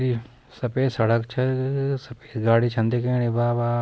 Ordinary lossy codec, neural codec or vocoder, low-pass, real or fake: none; none; none; real